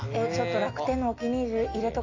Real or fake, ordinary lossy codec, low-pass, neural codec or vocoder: real; AAC, 32 kbps; 7.2 kHz; none